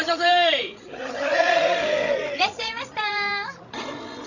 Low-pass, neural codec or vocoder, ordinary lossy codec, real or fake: 7.2 kHz; codec, 16 kHz, 16 kbps, FreqCodec, larger model; none; fake